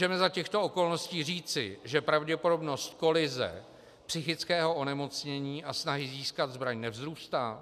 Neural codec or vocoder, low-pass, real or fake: none; 14.4 kHz; real